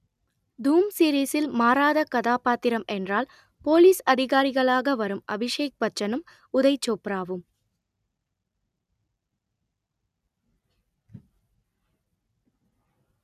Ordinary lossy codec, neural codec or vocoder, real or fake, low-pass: none; none; real; 14.4 kHz